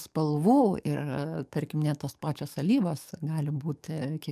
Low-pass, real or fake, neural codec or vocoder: 14.4 kHz; fake; codec, 44.1 kHz, 7.8 kbps, DAC